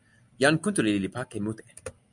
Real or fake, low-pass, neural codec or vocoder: real; 10.8 kHz; none